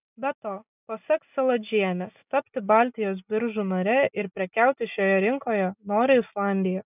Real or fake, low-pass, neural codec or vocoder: real; 3.6 kHz; none